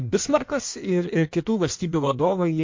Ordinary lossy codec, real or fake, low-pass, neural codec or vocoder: MP3, 48 kbps; fake; 7.2 kHz; codec, 16 kHz in and 24 kHz out, 1.1 kbps, FireRedTTS-2 codec